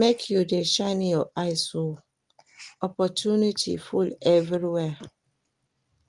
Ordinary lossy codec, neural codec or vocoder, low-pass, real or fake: Opus, 24 kbps; none; 10.8 kHz; real